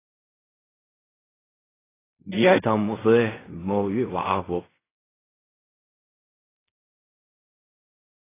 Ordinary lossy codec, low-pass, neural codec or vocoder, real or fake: AAC, 16 kbps; 3.6 kHz; codec, 16 kHz in and 24 kHz out, 0.4 kbps, LongCat-Audio-Codec, fine tuned four codebook decoder; fake